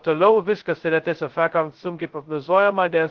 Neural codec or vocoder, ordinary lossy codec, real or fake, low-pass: codec, 16 kHz, 0.2 kbps, FocalCodec; Opus, 16 kbps; fake; 7.2 kHz